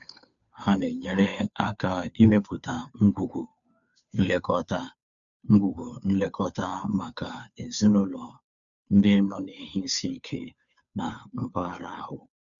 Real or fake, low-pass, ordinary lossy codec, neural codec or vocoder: fake; 7.2 kHz; none; codec, 16 kHz, 2 kbps, FunCodec, trained on Chinese and English, 25 frames a second